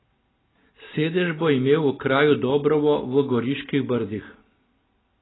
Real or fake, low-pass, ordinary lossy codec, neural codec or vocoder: real; 7.2 kHz; AAC, 16 kbps; none